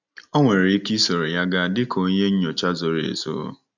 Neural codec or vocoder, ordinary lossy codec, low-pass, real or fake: none; none; 7.2 kHz; real